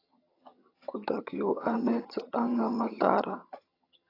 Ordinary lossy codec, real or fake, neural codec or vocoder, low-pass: AAC, 24 kbps; fake; vocoder, 22.05 kHz, 80 mel bands, HiFi-GAN; 5.4 kHz